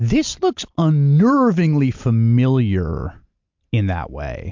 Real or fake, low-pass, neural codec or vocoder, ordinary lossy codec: real; 7.2 kHz; none; MP3, 64 kbps